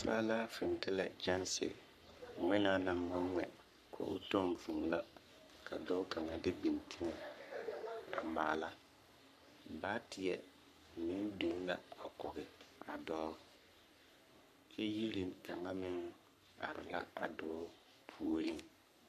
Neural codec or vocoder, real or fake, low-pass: codec, 44.1 kHz, 3.4 kbps, Pupu-Codec; fake; 14.4 kHz